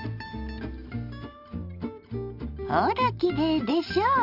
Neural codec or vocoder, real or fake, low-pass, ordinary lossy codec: none; real; 5.4 kHz; Opus, 64 kbps